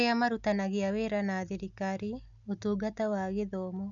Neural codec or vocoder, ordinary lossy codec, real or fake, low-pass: none; none; real; 7.2 kHz